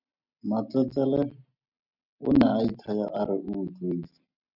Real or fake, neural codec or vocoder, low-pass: real; none; 5.4 kHz